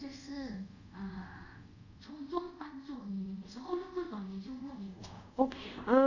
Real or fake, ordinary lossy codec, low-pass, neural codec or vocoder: fake; none; 7.2 kHz; codec, 24 kHz, 0.5 kbps, DualCodec